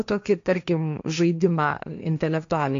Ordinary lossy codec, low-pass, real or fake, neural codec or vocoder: AAC, 64 kbps; 7.2 kHz; fake; codec, 16 kHz, 1.1 kbps, Voila-Tokenizer